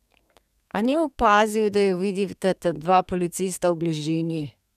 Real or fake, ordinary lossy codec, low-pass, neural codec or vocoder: fake; none; 14.4 kHz; codec, 32 kHz, 1.9 kbps, SNAC